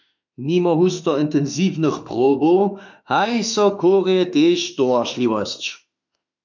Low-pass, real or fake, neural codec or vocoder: 7.2 kHz; fake; autoencoder, 48 kHz, 32 numbers a frame, DAC-VAE, trained on Japanese speech